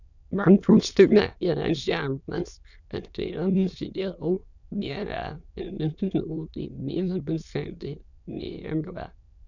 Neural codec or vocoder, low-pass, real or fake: autoencoder, 22.05 kHz, a latent of 192 numbers a frame, VITS, trained on many speakers; 7.2 kHz; fake